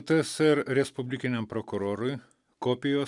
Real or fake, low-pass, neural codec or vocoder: real; 10.8 kHz; none